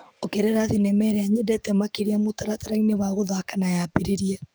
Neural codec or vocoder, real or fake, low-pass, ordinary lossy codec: codec, 44.1 kHz, 7.8 kbps, DAC; fake; none; none